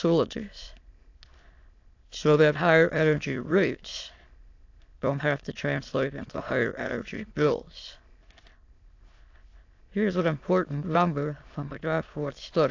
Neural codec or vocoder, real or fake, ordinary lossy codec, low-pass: autoencoder, 22.05 kHz, a latent of 192 numbers a frame, VITS, trained on many speakers; fake; AAC, 48 kbps; 7.2 kHz